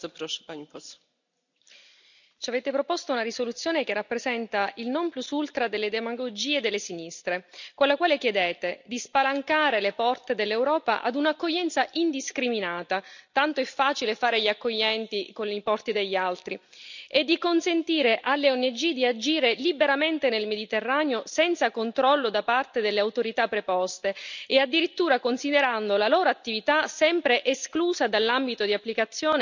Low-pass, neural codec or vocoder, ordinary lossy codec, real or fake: 7.2 kHz; none; none; real